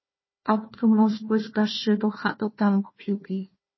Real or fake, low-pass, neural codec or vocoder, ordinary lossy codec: fake; 7.2 kHz; codec, 16 kHz, 1 kbps, FunCodec, trained on Chinese and English, 50 frames a second; MP3, 24 kbps